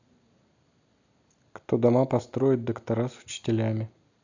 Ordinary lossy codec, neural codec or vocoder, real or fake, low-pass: AAC, 48 kbps; none; real; 7.2 kHz